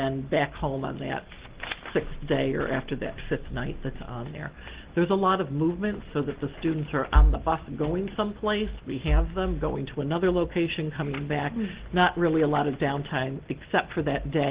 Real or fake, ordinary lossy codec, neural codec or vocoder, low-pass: real; Opus, 16 kbps; none; 3.6 kHz